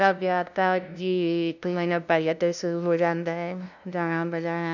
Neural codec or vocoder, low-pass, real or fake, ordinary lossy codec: codec, 16 kHz, 0.5 kbps, FunCodec, trained on LibriTTS, 25 frames a second; 7.2 kHz; fake; none